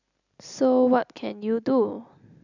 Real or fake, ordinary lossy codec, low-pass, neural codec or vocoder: real; none; 7.2 kHz; none